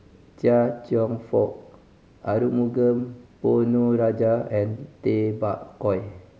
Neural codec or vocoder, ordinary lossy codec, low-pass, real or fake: none; none; none; real